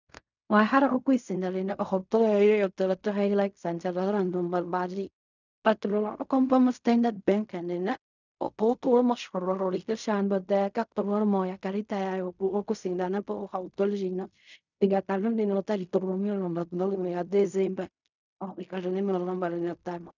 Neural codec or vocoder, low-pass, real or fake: codec, 16 kHz in and 24 kHz out, 0.4 kbps, LongCat-Audio-Codec, fine tuned four codebook decoder; 7.2 kHz; fake